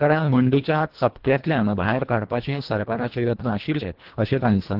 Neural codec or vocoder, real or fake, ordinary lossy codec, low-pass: codec, 24 kHz, 1.5 kbps, HILCodec; fake; Opus, 16 kbps; 5.4 kHz